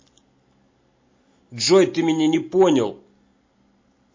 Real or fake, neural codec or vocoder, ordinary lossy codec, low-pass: real; none; MP3, 32 kbps; 7.2 kHz